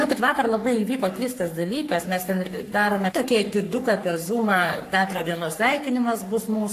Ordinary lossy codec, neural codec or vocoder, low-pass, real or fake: AAC, 64 kbps; codec, 44.1 kHz, 3.4 kbps, Pupu-Codec; 14.4 kHz; fake